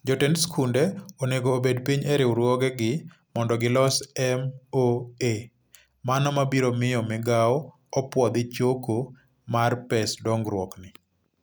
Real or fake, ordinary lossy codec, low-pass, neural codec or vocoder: real; none; none; none